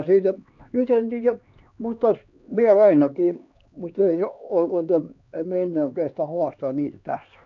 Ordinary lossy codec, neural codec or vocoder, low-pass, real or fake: none; codec, 16 kHz, 2 kbps, X-Codec, HuBERT features, trained on LibriSpeech; 7.2 kHz; fake